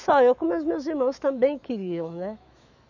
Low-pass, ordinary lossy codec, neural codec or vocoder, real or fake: 7.2 kHz; none; autoencoder, 48 kHz, 128 numbers a frame, DAC-VAE, trained on Japanese speech; fake